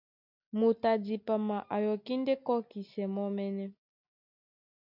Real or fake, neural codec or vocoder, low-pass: real; none; 5.4 kHz